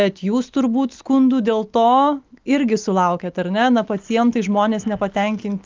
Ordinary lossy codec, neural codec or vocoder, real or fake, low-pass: Opus, 32 kbps; none; real; 7.2 kHz